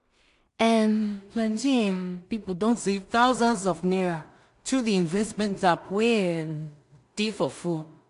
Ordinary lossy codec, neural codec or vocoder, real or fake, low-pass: AAC, 64 kbps; codec, 16 kHz in and 24 kHz out, 0.4 kbps, LongCat-Audio-Codec, two codebook decoder; fake; 10.8 kHz